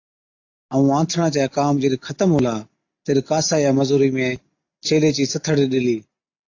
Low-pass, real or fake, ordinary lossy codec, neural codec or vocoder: 7.2 kHz; real; AAC, 48 kbps; none